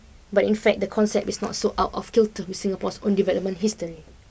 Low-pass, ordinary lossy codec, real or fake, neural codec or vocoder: none; none; real; none